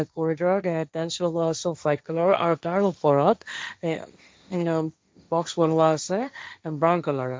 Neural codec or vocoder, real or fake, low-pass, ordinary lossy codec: codec, 16 kHz, 1.1 kbps, Voila-Tokenizer; fake; none; none